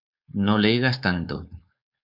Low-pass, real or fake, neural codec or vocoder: 5.4 kHz; fake; codec, 16 kHz, 4.8 kbps, FACodec